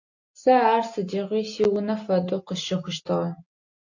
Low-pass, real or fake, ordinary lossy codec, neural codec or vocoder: 7.2 kHz; real; AAC, 48 kbps; none